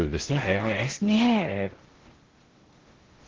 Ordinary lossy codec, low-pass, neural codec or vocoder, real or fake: Opus, 16 kbps; 7.2 kHz; codec, 16 kHz in and 24 kHz out, 0.6 kbps, FocalCodec, streaming, 2048 codes; fake